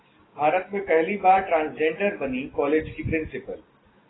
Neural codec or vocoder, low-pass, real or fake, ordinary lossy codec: none; 7.2 kHz; real; AAC, 16 kbps